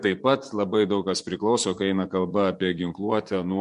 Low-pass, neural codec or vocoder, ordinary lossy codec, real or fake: 10.8 kHz; vocoder, 24 kHz, 100 mel bands, Vocos; MP3, 64 kbps; fake